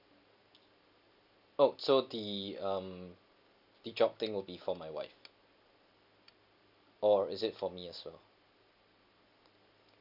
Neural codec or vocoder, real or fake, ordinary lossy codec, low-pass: none; real; none; 5.4 kHz